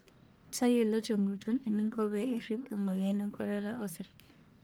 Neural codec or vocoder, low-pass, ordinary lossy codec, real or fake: codec, 44.1 kHz, 1.7 kbps, Pupu-Codec; none; none; fake